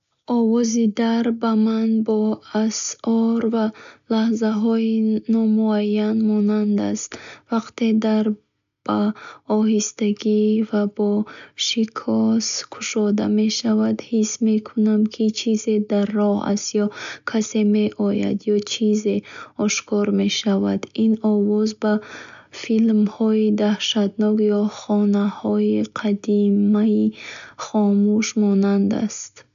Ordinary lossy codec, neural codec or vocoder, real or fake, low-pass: AAC, 96 kbps; none; real; 7.2 kHz